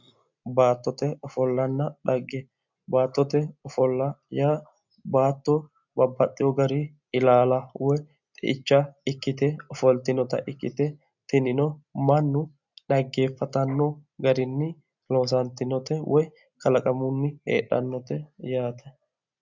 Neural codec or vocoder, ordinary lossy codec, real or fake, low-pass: none; MP3, 64 kbps; real; 7.2 kHz